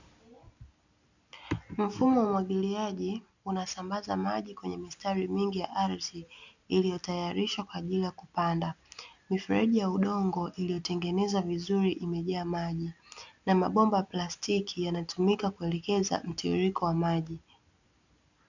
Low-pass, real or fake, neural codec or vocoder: 7.2 kHz; real; none